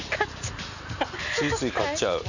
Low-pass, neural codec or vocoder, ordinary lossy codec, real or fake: 7.2 kHz; none; none; real